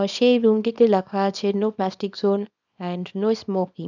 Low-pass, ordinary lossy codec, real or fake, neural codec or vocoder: 7.2 kHz; none; fake; codec, 24 kHz, 0.9 kbps, WavTokenizer, small release